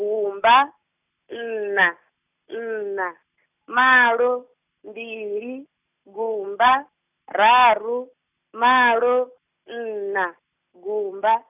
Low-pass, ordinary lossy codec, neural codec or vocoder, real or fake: 3.6 kHz; none; none; real